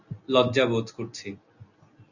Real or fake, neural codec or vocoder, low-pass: real; none; 7.2 kHz